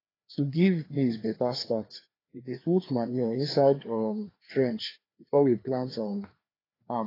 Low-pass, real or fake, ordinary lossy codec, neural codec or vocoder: 5.4 kHz; fake; AAC, 24 kbps; codec, 16 kHz, 2 kbps, FreqCodec, larger model